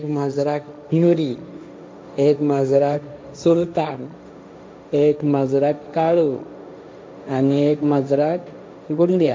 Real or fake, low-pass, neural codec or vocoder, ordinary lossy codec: fake; none; codec, 16 kHz, 1.1 kbps, Voila-Tokenizer; none